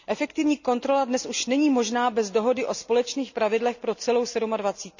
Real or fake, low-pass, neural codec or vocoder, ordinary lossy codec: real; 7.2 kHz; none; none